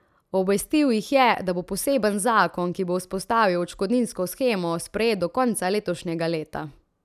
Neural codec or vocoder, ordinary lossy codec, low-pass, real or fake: none; none; 14.4 kHz; real